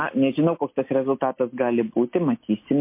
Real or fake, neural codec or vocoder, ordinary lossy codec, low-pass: real; none; MP3, 24 kbps; 3.6 kHz